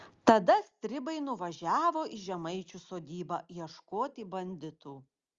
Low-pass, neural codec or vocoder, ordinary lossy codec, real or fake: 7.2 kHz; none; Opus, 32 kbps; real